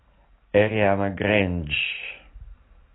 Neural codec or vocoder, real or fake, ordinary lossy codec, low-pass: none; real; AAC, 16 kbps; 7.2 kHz